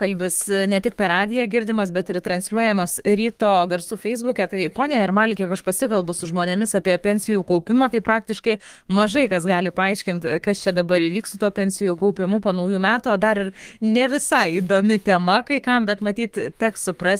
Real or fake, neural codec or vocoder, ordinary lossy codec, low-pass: fake; codec, 32 kHz, 1.9 kbps, SNAC; Opus, 32 kbps; 14.4 kHz